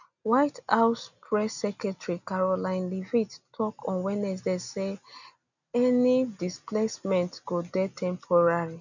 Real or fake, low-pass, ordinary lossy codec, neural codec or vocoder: real; 7.2 kHz; none; none